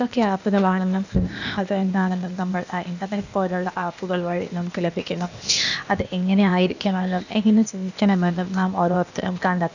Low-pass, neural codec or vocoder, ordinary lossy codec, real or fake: 7.2 kHz; codec, 16 kHz, 0.8 kbps, ZipCodec; none; fake